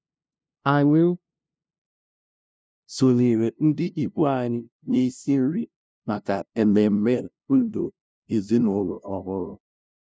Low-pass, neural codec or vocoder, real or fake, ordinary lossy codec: none; codec, 16 kHz, 0.5 kbps, FunCodec, trained on LibriTTS, 25 frames a second; fake; none